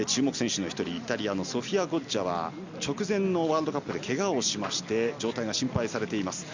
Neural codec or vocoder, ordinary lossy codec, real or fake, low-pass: none; Opus, 64 kbps; real; 7.2 kHz